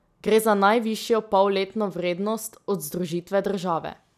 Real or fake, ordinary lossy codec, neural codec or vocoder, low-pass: real; none; none; 14.4 kHz